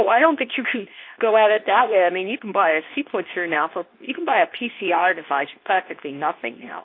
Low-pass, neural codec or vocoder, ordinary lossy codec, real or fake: 5.4 kHz; codec, 24 kHz, 0.9 kbps, WavTokenizer, medium speech release version 2; AAC, 32 kbps; fake